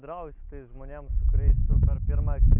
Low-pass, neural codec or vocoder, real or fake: 3.6 kHz; none; real